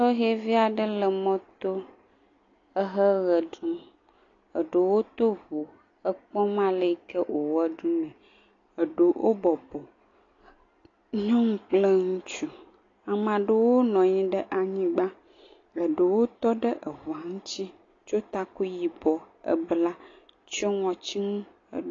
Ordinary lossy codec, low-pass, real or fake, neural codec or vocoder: AAC, 32 kbps; 7.2 kHz; real; none